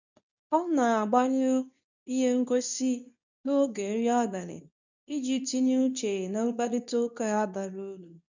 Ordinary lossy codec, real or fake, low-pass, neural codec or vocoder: none; fake; 7.2 kHz; codec, 24 kHz, 0.9 kbps, WavTokenizer, medium speech release version 2